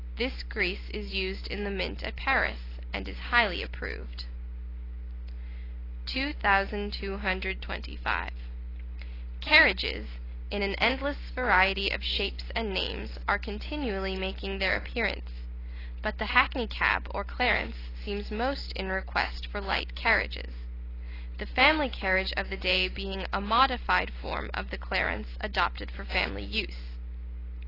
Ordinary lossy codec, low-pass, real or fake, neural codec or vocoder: AAC, 24 kbps; 5.4 kHz; real; none